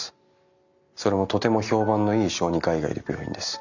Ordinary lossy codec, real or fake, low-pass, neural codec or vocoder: none; real; 7.2 kHz; none